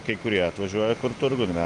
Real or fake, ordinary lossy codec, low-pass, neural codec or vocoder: real; Opus, 24 kbps; 10.8 kHz; none